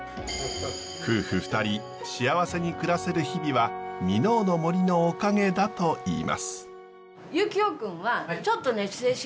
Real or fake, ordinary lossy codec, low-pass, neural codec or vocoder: real; none; none; none